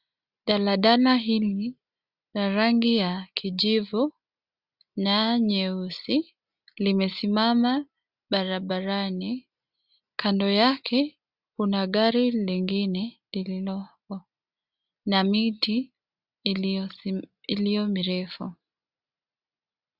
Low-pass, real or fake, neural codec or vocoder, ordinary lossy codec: 5.4 kHz; real; none; Opus, 64 kbps